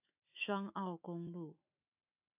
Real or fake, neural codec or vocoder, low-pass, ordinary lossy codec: fake; autoencoder, 48 kHz, 128 numbers a frame, DAC-VAE, trained on Japanese speech; 3.6 kHz; AAC, 32 kbps